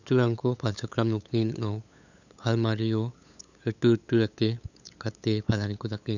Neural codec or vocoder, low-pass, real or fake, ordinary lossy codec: codec, 16 kHz, 8 kbps, FunCodec, trained on LibriTTS, 25 frames a second; 7.2 kHz; fake; none